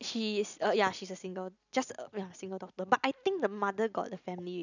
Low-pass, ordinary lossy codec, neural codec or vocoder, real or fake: 7.2 kHz; none; none; real